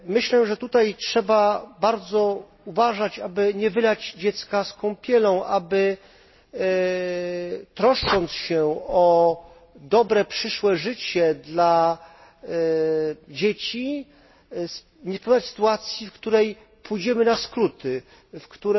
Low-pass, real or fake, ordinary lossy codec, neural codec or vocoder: 7.2 kHz; real; MP3, 24 kbps; none